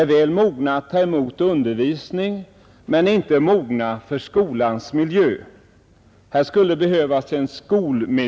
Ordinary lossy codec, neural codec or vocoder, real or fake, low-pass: none; none; real; none